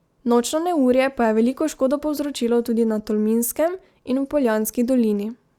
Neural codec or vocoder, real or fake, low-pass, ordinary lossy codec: none; real; 19.8 kHz; Opus, 64 kbps